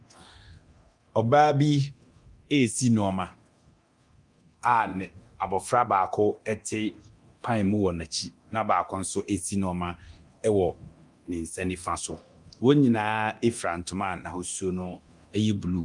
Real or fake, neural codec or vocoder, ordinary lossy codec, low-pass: fake; codec, 24 kHz, 0.9 kbps, DualCodec; Opus, 32 kbps; 10.8 kHz